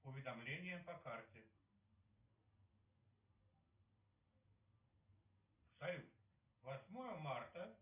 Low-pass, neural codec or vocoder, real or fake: 3.6 kHz; none; real